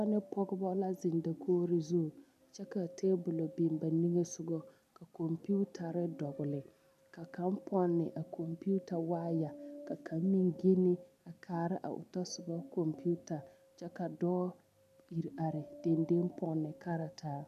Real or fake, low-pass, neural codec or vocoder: real; 14.4 kHz; none